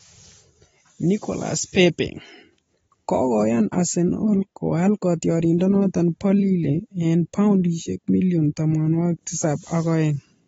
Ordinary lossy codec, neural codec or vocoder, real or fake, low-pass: AAC, 24 kbps; none; real; 19.8 kHz